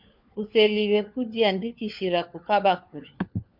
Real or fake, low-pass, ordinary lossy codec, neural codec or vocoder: fake; 5.4 kHz; MP3, 48 kbps; codec, 16 kHz, 4 kbps, FunCodec, trained on LibriTTS, 50 frames a second